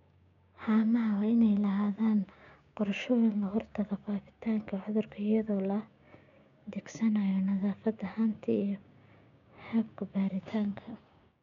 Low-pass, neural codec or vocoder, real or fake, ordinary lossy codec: 7.2 kHz; codec, 16 kHz, 6 kbps, DAC; fake; none